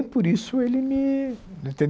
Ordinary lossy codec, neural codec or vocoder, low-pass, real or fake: none; none; none; real